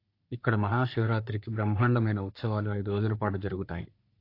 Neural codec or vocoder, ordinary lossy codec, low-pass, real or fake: codec, 44.1 kHz, 3.4 kbps, Pupu-Codec; AAC, 32 kbps; 5.4 kHz; fake